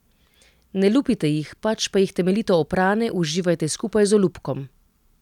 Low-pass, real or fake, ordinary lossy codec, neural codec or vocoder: 19.8 kHz; real; none; none